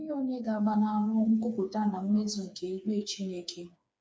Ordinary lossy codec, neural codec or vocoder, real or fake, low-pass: none; codec, 16 kHz, 4 kbps, FreqCodec, smaller model; fake; none